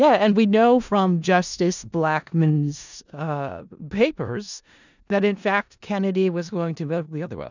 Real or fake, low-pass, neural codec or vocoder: fake; 7.2 kHz; codec, 16 kHz in and 24 kHz out, 0.4 kbps, LongCat-Audio-Codec, four codebook decoder